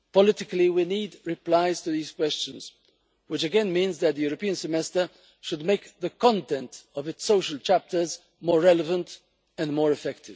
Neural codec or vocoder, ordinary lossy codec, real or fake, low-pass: none; none; real; none